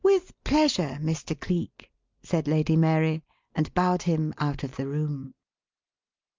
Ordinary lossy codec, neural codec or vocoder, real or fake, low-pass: Opus, 32 kbps; none; real; 7.2 kHz